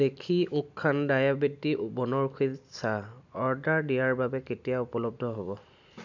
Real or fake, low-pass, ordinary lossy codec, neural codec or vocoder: real; 7.2 kHz; none; none